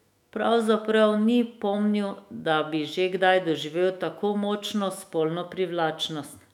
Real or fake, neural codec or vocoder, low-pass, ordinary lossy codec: fake; autoencoder, 48 kHz, 128 numbers a frame, DAC-VAE, trained on Japanese speech; 19.8 kHz; none